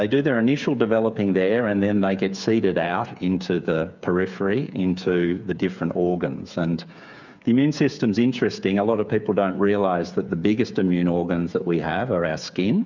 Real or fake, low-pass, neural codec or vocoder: fake; 7.2 kHz; codec, 16 kHz, 8 kbps, FreqCodec, smaller model